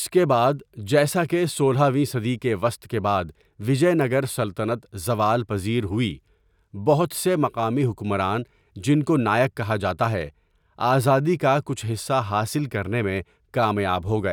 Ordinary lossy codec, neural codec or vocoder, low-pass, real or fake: none; none; 19.8 kHz; real